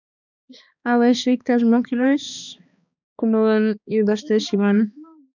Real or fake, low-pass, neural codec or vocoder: fake; 7.2 kHz; codec, 16 kHz, 2 kbps, X-Codec, HuBERT features, trained on balanced general audio